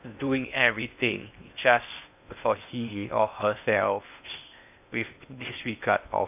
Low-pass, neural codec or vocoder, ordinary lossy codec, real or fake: 3.6 kHz; codec, 16 kHz in and 24 kHz out, 0.6 kbps, FocalCodec, streaming, 2048 codes; AAC, 32 kbps; fake